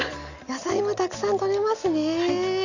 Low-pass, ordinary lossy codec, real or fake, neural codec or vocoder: 7.2 kHz; none; real; none